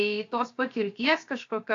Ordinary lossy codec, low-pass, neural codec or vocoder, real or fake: AAC, 48 kbps; 7.2 kHz; codec, 16 kHz, 0.7 kbps, FocalCodec; fake